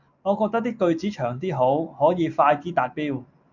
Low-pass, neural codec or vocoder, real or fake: 7.2 kHz; none; real